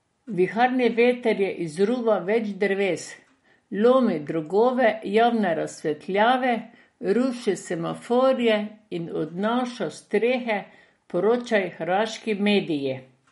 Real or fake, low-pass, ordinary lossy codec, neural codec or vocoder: real; 19.8 kHz; MP3, 48 kbps; none